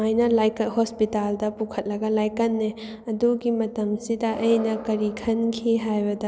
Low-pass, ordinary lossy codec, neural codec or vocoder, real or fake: none; none; none; real